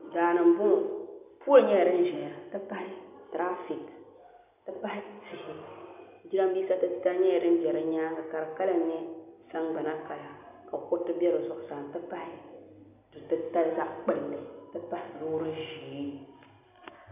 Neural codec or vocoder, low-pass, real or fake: none; 3.6 kHz; real